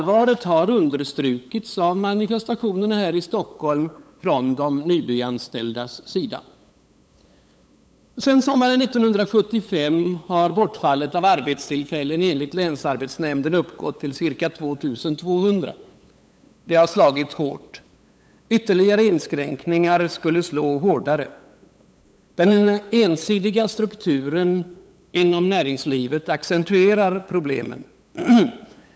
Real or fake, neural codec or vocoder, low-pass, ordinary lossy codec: fake; codec, 16 kHz, 8 kbps, FunCodec, trained on LibriTTS, 25 frames a second; none; none